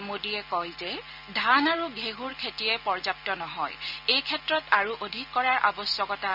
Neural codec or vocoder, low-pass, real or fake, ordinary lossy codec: none; 5.4 kHz; real; none